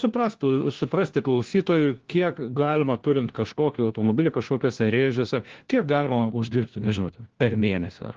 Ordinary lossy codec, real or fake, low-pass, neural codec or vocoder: Opus, 16 kbps; fake; 7.2 kHz; codec, 16 kHz, 1 kbps, FunCodec, trained on LibriTTS, 50 frames a second